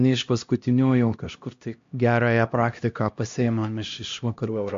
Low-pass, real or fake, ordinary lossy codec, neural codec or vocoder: 7.2 kHz; fake; MP3, 48 kbps; codec, 16 kHz, 1 kbps, X-Codec, HuBERT features, trained on LibriSpeech